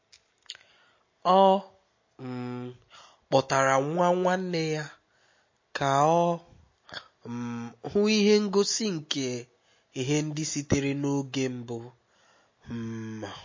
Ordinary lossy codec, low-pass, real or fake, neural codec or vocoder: MP3, 32 kbps; 7.2 kHz; real; none